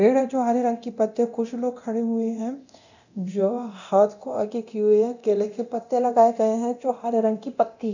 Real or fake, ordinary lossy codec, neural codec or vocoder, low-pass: fake; none; codec, 24 kHz, 0.9 kbps, DualCodec; 7.2 kHz